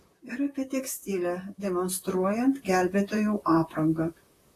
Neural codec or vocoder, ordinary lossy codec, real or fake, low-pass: vocoder, 44.1 kHz, 128 mel bands, Pupu-Vocoder; AAC, 48 kbps; fake; 14.4 kHz